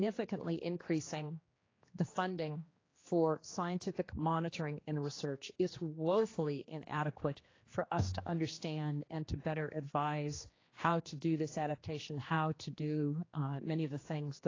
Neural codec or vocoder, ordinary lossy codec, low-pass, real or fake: codec, 16 kHz, 2 kbps, X-Codec, HuBERT features, trained on general audio; AAC, 32 kbps; 7.2 kHz; fake